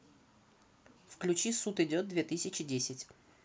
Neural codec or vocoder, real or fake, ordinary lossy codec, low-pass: none; real; none; none